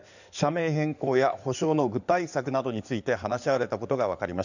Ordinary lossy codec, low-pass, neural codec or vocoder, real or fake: none; 7.2 kHz; codec, 16 kHz in and 24 kHz out, 2.2 kbps, FireRedTTS-2 codec; fake